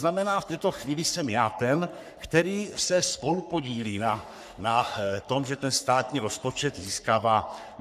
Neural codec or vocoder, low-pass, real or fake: codec, 44.1 kHz, 3.4 kbps, Pupu-Codec; 14.4 kHz; fake